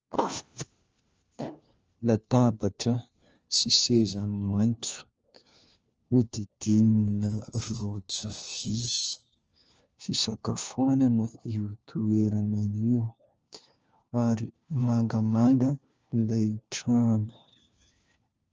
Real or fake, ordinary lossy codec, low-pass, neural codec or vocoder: fake; Opus, 24 kbps; 7.2 kHz; codec, 16 kHz, 1 kbps, FunCodec, trained on LibriTTS, 50 frames a second